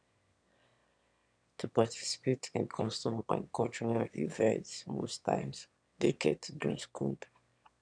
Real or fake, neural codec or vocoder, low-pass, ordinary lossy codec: fake; autoencoder, 22.05 kHz, a latent of 192 numbers a frame, VITS, trained on one speaker; 9.9 kHz; none